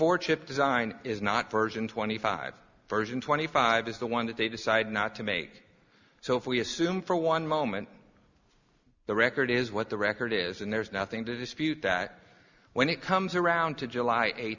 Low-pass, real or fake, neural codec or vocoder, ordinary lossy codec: 7.2 kHz; real; none; Opus, 64 kbps